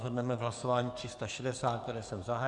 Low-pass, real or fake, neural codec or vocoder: 10.8 kHz; fake; codec, 44.1 kHz, 7.8 kbps, DAC